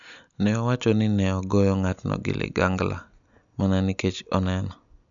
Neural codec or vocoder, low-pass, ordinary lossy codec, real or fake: none; 7.2 kHz; none; real